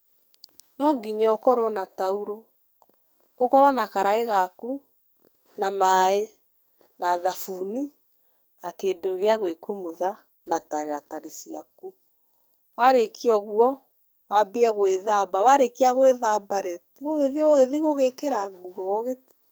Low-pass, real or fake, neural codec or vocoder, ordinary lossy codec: none; fake; codec, 44.1 kHz, 2.6 kbps, SNAC; none